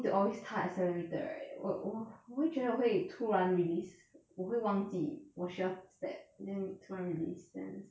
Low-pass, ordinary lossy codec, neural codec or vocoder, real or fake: none; none; none; real